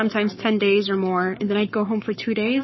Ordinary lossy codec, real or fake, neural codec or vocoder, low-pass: MP3, 24 kbps; fake; codec, 44.1 kHz, 7.8 kbps, Pupu-Codec; 7.2 kHz